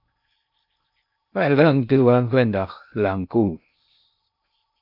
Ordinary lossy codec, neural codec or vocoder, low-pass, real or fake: AAC, 48 kbps; codec, 16 kHz in and 24 kHz out, 0.6 kbps, FocalCodec, streaming, 2048 codes; 5.4 kHz; fake